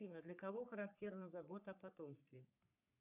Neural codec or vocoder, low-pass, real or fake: codec, 44.1 kHz, 3.4 kbps, Pupu-Codec; 3.6 kHz; fake